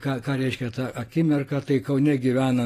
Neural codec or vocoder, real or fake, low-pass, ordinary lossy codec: vocoder, 44.1 kHz, 128 mel bands every 512 samples, BigVGAN v2; fake; 14.4 kHz; AAC, 48 kbps